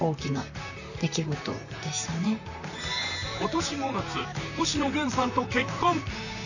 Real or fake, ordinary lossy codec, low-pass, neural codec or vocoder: fake; none; 7.2 kHz; vocoder, 44.1 kHz, 128 mel bands, Pupu-Vocoder